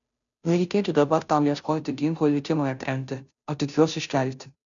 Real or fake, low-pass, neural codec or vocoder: fake; 7.2 kHz; codec, 16 kHz, 0.5 kbps, FunCodec, trained on Chinese and English, 25 frames a second